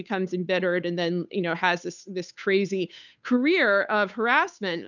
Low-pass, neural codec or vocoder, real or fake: 7.2 kHz; codec, 16 kHz, 8 kbps, FunCodec, trained on Chinese and English, 25 frames a second; fake